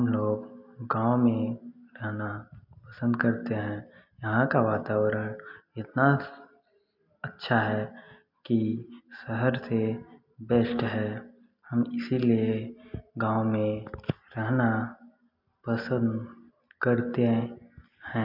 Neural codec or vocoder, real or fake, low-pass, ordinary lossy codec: none; real; 5.4 kHz; MP3, 48 kbps